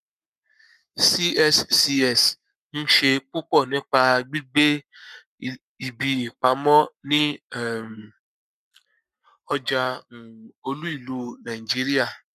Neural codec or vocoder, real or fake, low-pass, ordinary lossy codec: codec, 44.1 kHz, 7.8 kbps, Pupu-Codec; fake; 14.4 kHz; none